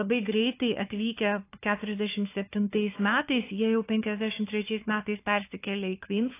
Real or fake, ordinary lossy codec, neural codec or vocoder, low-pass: fake; AAC, 24 kbps; codec, 16 kHz, 4 kbps, FunCodec, trained on Chinese and English, 50 frames a second; 3.6 kHz